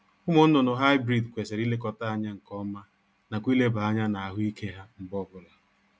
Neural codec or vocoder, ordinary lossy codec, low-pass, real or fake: none; none; none; real